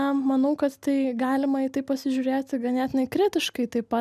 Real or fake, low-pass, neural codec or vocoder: real; 14.4 kHz; none